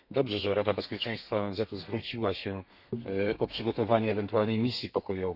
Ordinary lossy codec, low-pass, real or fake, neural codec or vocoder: none; 5.4 kHz; fake; codec, 32 kHz, 1.9 kbps, SNAC